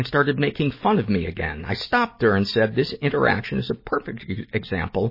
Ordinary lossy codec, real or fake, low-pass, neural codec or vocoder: MP3, 24 kbps; real; 5.4 kHz; none